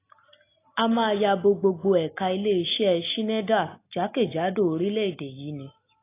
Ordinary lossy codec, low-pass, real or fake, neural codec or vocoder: AAC, 24 kbps; 3.6 kHz; real; none